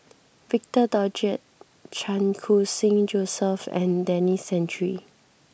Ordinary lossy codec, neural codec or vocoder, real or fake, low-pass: none; none; real; none